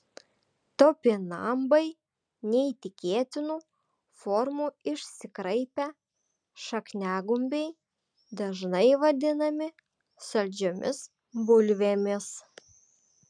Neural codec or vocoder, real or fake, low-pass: none; real; 9.9 kHz